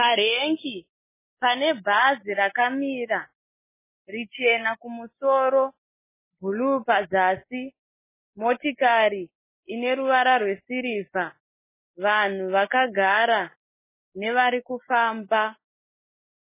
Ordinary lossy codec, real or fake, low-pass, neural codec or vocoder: MP3, 16 kbps; real; 3.6 kHz; none